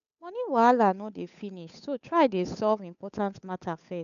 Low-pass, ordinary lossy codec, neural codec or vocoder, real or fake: 7.2 kHz; none; codec, 16 kHz, 8 kbps, FunCodec, trained on Chinese and English, 25 frames a second; fake